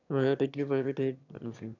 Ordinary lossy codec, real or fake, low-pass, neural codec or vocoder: none; fake; 7.2 kHz; autoencoder, 22.05 kHz, a latent of 192 numbers a frame, VITS, trained on one speaker